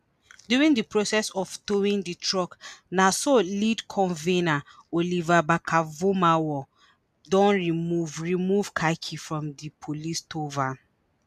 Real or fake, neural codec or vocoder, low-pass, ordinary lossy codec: real; none; 14.4 kHz; none